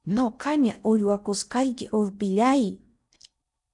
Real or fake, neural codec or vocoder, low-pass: fake; codec, 16 kHz in and 24 kHz out, 0.6 kbps, FocalCodec, streaming, 4096 codes; 10.8 kHz